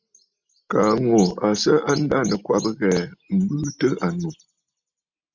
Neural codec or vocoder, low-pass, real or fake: none; 7.2 kHz; real